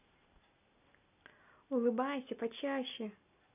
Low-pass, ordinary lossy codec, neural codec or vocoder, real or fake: 3.6 kHz; none; none; real